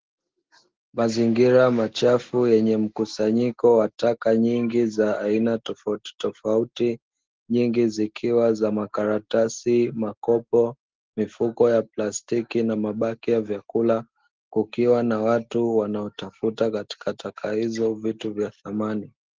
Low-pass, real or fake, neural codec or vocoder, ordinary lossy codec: 7.2 kHz; real; none; Opus, 16 kbps